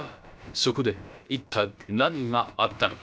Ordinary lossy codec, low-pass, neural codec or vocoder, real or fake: none; none; codec, 16 kHz, about 1 kbps, DyCAST, with the encoder's durations; fake